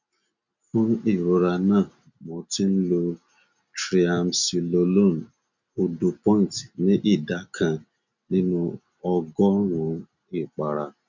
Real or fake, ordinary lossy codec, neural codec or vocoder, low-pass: real; none; none; 7.2 kHz